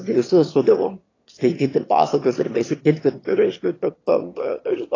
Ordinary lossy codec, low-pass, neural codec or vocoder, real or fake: AAC, 32 kbps; 7.2 kHz; autoencoder, 22.05 kHz, a latent of 192 numbers a frame, VITS, trained on one speaker; fake